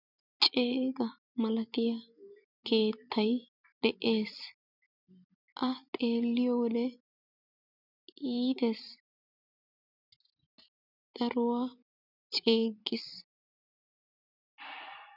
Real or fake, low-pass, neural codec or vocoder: fake; 5.4 kHz; vocoder, 44.1 kHz, 128 mel bands every 256 samples, BigVGAN v2